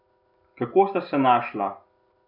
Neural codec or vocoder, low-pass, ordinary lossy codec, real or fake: none; 5.4 kHz; none; real